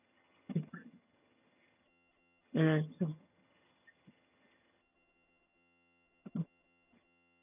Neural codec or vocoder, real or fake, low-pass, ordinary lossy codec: vocoder, 22.05 kHz, 80 mel bands, HiFi-GAN; fake; 3.6 kHz; none